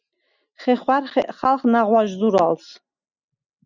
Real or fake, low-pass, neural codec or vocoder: real; 7.2 kHz; none